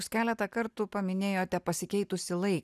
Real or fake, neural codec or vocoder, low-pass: real; none; 14.4 kHz